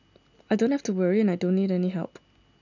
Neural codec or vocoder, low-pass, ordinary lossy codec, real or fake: none; 7.2 kHz; none; real